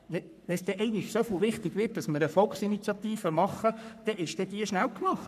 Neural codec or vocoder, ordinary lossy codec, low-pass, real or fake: codec, 44.1 kHz, 3.4 kbps, Pupu-Codec; MP3, 96 kbps; 14.4 kHz; fake